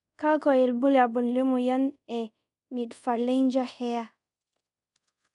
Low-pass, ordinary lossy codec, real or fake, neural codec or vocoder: 10.8 kHz; none; fake; codec, 24 kHz, 0.5 kbps, DualCodec